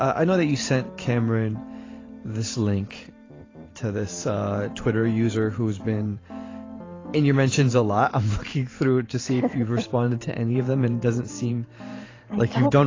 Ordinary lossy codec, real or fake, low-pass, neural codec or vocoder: AAC, 32 kbps; fake; 7.2 kHz; vocoder, 44.1 kHz, 128 mel bands every 512 samples, BigVGAN v2